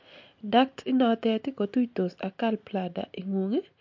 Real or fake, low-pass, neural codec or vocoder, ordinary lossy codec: real; 7.2 kHz; none; MP3, 48 kbps